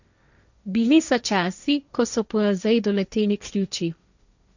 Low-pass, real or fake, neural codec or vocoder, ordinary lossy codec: 7.2 kHz; fake; codec, 16 kHz, 1.1 kbps, Voila-Tokenizer; none